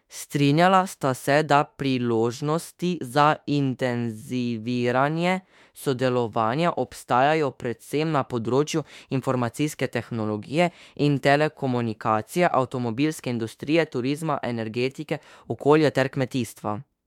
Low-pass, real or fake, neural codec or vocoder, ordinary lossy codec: 19.8 kHz; fake; autoencoder, 48 kHz, 32 numbers a frame, DAC-VAE, trained on Japanese speech; MP3, 96 kbps